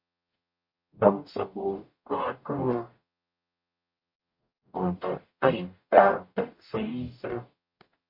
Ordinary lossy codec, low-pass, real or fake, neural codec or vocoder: MP3, 32 kbps; 5.4 kHz; fake; codec, 44.1 kHz, 0.9 kbps, DAC